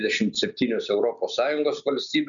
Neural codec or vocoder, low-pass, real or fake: none; 7.2 kHz; real